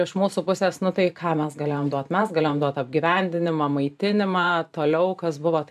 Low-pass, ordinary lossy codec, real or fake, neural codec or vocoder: 14.4 kHz; AAC, 96 kbps; fake; vocoder, 44.1 kHz, 128 mel bands every 512 samples, BigVGAN v2